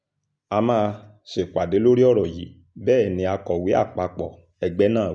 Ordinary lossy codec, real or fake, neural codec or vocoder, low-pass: none; real; none; 9.9 kHz